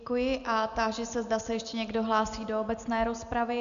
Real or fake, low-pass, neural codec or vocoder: real; 7.2 kHz; none